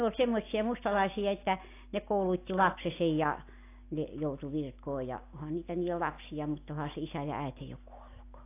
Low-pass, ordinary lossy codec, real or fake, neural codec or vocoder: 3.6 kHz; AAC, 24 kbps; real; none